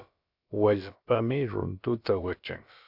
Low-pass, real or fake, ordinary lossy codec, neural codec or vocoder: 5.4 kHz; fake; AAC, 48 kbps; codec, 16 kHz, about 1 kbps, DyCAST, with the encoder's durations